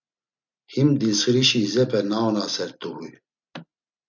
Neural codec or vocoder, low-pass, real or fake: none; 7.2 kHz; real